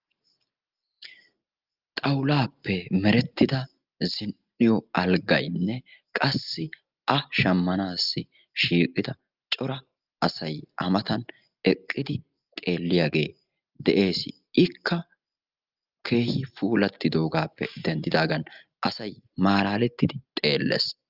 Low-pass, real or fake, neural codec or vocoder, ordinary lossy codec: 5.4 kHz; real; none; Opus, 32 kbps